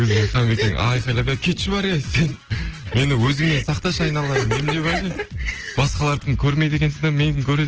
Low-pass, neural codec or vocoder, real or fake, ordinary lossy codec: 7.2 kHz; none; real; Opus, 16 kbps